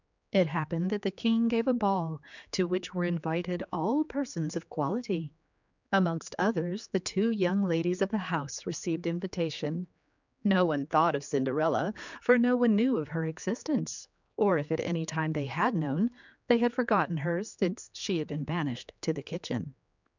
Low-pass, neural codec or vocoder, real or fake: 7.2 kHz; codec, 16 kHz, 4 kbps, X-Codec, HuBERT features, trained on general audio; fake